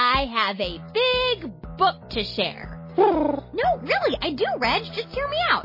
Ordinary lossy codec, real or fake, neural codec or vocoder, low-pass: MP3, 24 kbps; real; none; 5.4 kHz